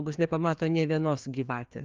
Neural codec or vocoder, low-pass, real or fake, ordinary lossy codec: codec, 16 kHz, 2 kbps, FreqCodec, larger model; 7.2 kHz; fake; Opus, 32 kbps